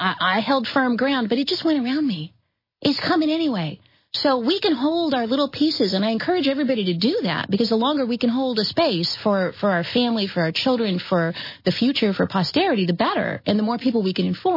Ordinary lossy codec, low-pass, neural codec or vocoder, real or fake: MP3, 24 kbps; 5.4 kHz; none; real